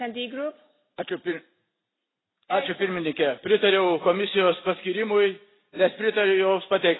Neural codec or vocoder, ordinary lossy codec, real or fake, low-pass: none; AAC, 16 kbps; real; 7.2 kHz